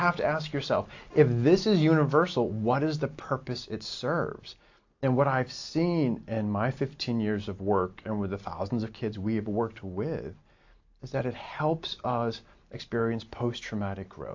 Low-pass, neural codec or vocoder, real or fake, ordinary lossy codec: 7.2 kHz; none; real; AAC, 48 kbps